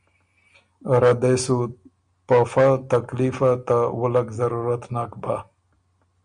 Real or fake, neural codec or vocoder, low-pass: real; none; 9.9 kHz